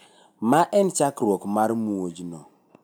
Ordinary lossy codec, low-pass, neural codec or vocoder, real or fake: none; none; none; real